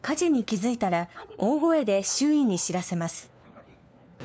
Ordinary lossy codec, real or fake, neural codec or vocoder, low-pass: none; fake; codec, 16 kHz, 4 kbps, FunCodec, trained on LibriTTS, 50 frames a second; none